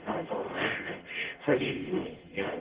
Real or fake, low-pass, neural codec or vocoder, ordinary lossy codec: fake; 3.6 kHz; codec, 44.1 kHz, 0.9 kbps, DAC; Opus, 16 kbps